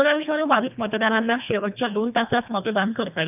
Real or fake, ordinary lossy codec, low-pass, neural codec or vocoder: fake; none; 3.6 kHz; codec, 24 kHz, 1.5 kbps, HILCodec